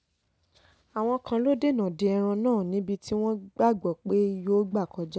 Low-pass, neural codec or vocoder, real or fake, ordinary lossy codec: none; none; real; none